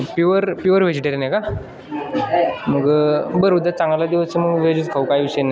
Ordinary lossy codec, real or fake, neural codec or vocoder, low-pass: none; real; none; none